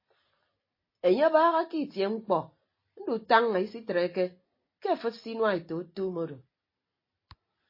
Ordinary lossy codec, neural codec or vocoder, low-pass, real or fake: MP3, 24 kbps; none; 5.4 kHz; real